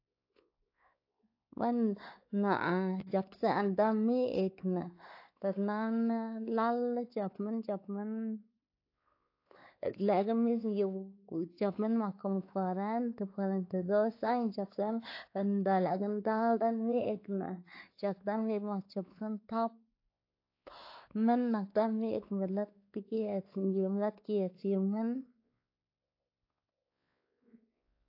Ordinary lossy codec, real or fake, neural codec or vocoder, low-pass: AAC, 32 kbps; fake; codec, 16 kHz, 4 kbps, X-Codec, WavLM features, trained on Multilingual LibriSpeech; 5.4 kHz